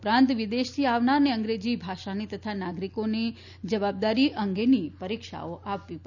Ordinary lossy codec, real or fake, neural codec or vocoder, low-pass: none; real; none; 7.2 kHz